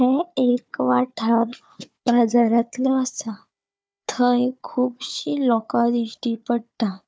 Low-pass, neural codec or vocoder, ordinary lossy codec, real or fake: none; codec, 16 kHz, 4 kbps, FunCodec, trained on Chinese and English, 50 frames a second; none; fake